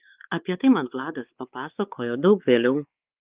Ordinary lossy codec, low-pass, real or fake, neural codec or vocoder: Opus, 32 kbps; 3.6 kHz; fake; codec, 16 kHz, 4 kbps, X-Codec, WavLM features, trained on Multilingual LibriSpeech